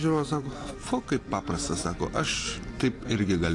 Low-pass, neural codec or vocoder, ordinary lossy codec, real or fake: 10.8 kHz; none; AAC, 48 kbps; real